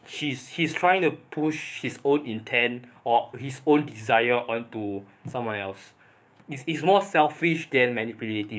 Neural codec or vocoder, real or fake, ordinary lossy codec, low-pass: codec, 16 kHz, 6 kbps, DAC; fake; none; none